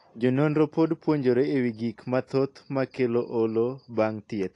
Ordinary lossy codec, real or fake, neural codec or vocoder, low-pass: AAC, 48 kbps; real; none; 10.8 kHz